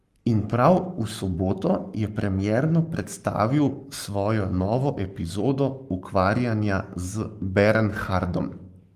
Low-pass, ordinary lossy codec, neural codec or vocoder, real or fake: 14.4 kHz; Opus, 32 kbps; codec, 44.1 kHz, 7.8 kbps, Pupu-Codec; fake